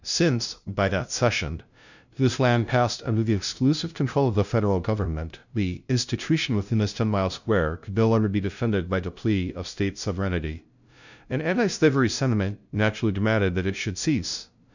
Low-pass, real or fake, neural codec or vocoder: 7.2 kHz; fake; codec, 16 kHz, 0.5 kbps, FunCodec, trained on LibriTTS, 25 frames a second